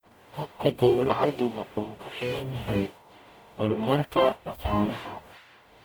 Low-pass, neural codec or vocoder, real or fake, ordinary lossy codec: none; codec, 44.1 kHz, 0.9 kbps, DAC; fake; none